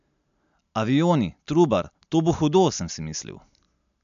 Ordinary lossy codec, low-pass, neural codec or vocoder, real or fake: MP3, 64 kbps; 7.2 kHz; none; real